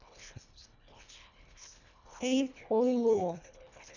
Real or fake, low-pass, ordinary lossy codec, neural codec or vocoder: fake; 7.2 kHz; none; codec, 24 kHz, 1.5 kbps, HILCodec